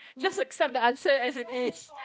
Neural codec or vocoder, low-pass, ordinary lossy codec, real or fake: codec, 16 kHz, 1 kbps, X-Codec, HuBERT features, trained on balanced general audio; none; none; fake